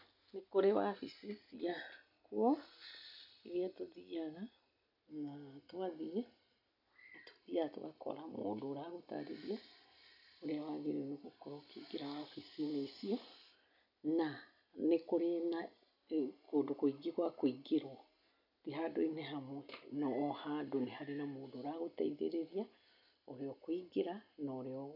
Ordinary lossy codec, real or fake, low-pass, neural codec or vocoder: none; real; 5.4 kHz; none